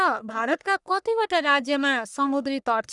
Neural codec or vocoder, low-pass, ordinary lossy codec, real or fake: codec, 44.1 kHz, 1.7 kbps, Pupu-Codec; 10.8 kHz; none; fake